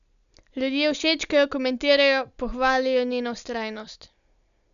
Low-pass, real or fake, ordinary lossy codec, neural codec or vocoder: 7.2 kHz; real; none; none